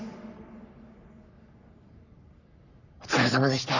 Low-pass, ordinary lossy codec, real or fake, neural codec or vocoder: 7.2 kHz; none; fake; codec, 44.1 kHz, 3.4 kbps, Pupu-Codec